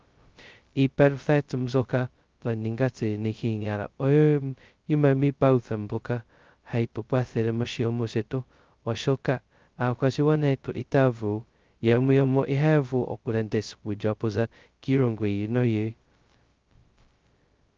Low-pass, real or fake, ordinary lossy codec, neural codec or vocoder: 7.2 kHz; fake; Opus, 24 kbps; codec, 16 kHz, 0.2 kbps, FocalCodec